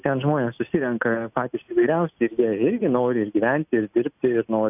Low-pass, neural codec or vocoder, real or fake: 3.6 kHz; none; real